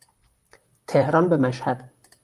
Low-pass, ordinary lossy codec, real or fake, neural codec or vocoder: 14.4 kHz; Opus, 32 kbps; fake; vocoder, 44.1 kHz, 128 mel bands, Pupu-Vocoder